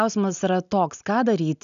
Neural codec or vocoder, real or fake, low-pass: none; real; 7.2 kHz